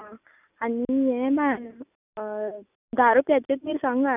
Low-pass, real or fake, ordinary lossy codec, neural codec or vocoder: 3.6 kHz; real; none; none